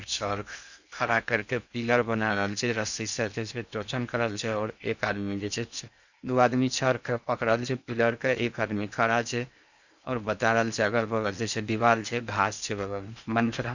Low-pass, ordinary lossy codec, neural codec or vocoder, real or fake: 7.2 kHz; none; codec, 16 kHz in and 24 kHz out, 0.8 kbps, FocalCodec, streaming, 65536 codes; fake